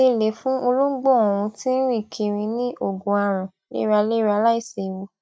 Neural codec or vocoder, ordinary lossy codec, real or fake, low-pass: none; none; real; none